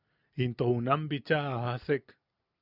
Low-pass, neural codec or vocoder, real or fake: 5.4 kHz; none; real